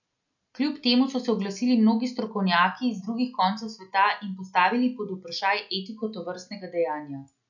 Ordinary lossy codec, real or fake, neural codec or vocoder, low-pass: none; real; none; 7.2 kHz